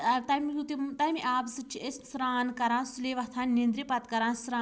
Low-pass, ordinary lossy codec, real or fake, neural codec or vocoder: none; none; real; none